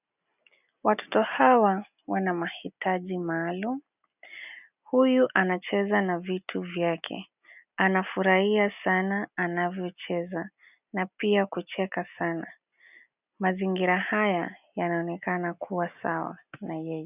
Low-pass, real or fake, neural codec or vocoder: 3.6 kHz; real; none